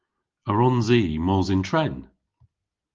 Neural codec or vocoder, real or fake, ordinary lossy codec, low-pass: none; real; Opus, 32 kbps; 7.2 kHz